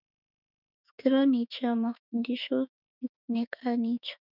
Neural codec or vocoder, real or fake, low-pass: autoencoder, 48 kHz, 32 numbers a frame, DAC-VAE, trained on Japanese speech; fake; 5.4 kHz